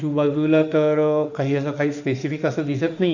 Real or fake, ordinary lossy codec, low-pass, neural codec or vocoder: fake; none; 7.2 kHz; autoencoder, 48 kHz, 32 numbers a frame, DAC-VAE, trained on Japanese speech